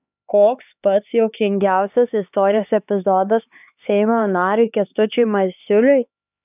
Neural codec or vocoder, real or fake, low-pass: codec, 16 kHz, 4 kbps, X-Codec, HuBERT features, trained on LibriSpeech; fake; 3.6 kHz